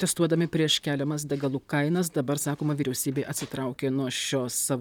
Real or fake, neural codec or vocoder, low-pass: fake; vocoder, 44.1 kHz, 128 mel bands, Pupu-Vocoder; 19.8 kHz